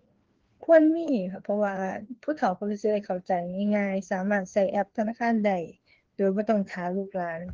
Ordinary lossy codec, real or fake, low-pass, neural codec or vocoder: Opus, 16 kbps; fake; 7.2 kHz; codec, 16 kHz, 2 kbps, FreqCodec, larger model